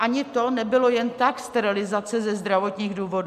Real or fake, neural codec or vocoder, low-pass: real; none; 14.4 kHz